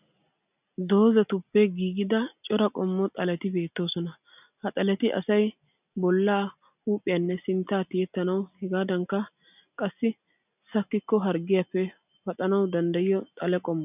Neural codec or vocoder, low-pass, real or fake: none; 3.6 kHz; real